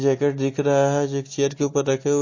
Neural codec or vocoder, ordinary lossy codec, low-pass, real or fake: none; MP3, 32 kbps; 7.2 kHz; real